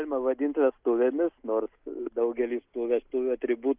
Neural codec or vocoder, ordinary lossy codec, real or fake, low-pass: none; Opus, 32 kbps; real; 3.6 kHz